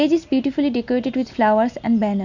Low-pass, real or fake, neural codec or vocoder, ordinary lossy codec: 7.2 kHz; real; none; none